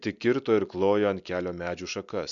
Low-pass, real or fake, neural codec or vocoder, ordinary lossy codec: 7.2 kHz; real; none; MP3, 64 kbps